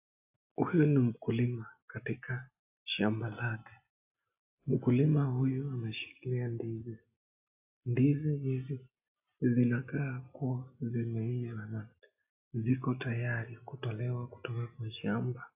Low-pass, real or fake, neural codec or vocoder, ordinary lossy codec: 3.6 kHz; real; none; AAC, 32 kbps